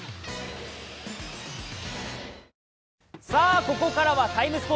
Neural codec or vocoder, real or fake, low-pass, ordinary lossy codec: none; real; none; none